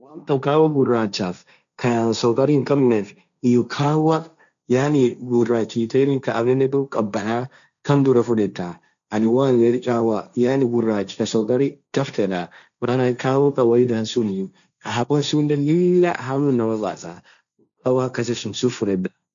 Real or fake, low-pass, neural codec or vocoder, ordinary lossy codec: fake; 7.2 kHz; codec, 16 kHz, 1.1 kbps, Voila-Tokenizer; none